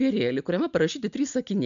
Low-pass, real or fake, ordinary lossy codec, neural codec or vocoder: 7.2 kHz; real; MP3, 64 kbps; none